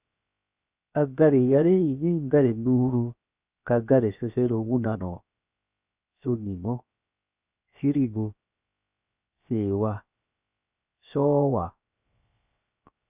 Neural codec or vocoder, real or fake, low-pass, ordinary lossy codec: codec, 16 kHz, 0.7 kbps, FocalCodec; fake; 3.6 kHz; Opus, 64 kbps